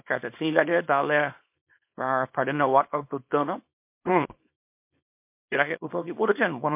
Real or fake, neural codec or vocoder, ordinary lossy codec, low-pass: fake; codec, 24 kHz, 0.9 kbps, WavTokenizer, small release; MP3, 32 kbps; 3.6 kHz